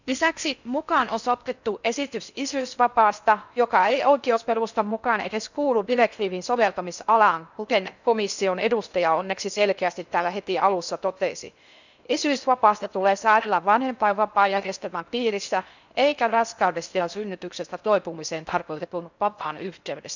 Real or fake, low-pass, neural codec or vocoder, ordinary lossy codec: fake; 7.2 kHz; codec, 16 kHz in and 24 kHz out, 0.6 kbps, FocalCodec, streaming, 4096 codes; none